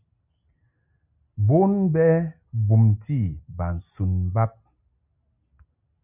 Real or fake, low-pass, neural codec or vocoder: real; 3.6 kHz; none